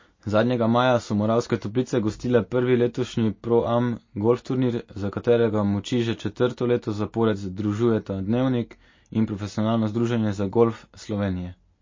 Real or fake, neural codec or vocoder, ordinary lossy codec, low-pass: real; none; MP3, 32 kbps; 7.2 kHz